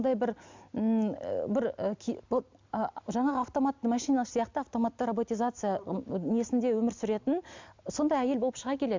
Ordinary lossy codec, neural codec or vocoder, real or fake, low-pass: MP3, 64 kbps; none; real; 7.2 kHz